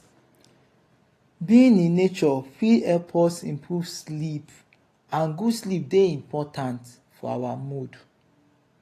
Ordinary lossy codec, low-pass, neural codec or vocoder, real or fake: AAC, 48 kbps; 14.4 kHz; none; real